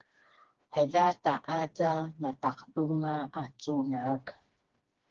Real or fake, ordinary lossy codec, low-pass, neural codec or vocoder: fake; Opus, 16 kbps; 7.2 kHz; codec, 16 kHz, 2 kbps, FreqCodec, smaller model